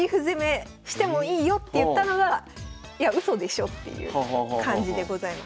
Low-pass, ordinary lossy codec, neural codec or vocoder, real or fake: none; none; none; real